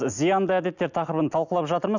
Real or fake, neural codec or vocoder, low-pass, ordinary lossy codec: real; none; 7.2 kHz; none